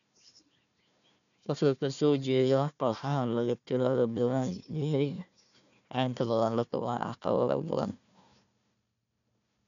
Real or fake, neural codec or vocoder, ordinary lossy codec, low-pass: fake; codec, 16 kHz, 1 kbps, FunCodec, trained on Chinese and English, 50 frames a second; none; 7.2 kHz